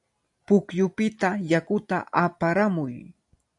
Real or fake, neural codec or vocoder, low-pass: real; none; 10.8 kHz